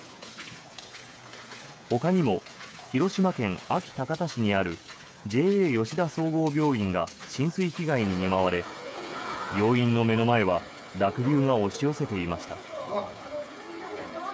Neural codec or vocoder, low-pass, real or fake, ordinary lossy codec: codec, 16 kHz, 8 kbps, FreqCodec, smaller model; none; fake; none